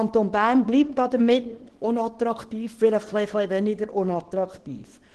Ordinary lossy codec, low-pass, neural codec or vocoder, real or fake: Opus, 16 kbps; 10.8 kHz; codec, 24 kHz, 0.9 kbps, WavTokenizer, small release; fake